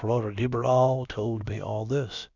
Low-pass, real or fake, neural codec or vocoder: 7.2 kHz; fake; codec, 16 kHz, about 1 kbps, DyCAST, with the encoder's durations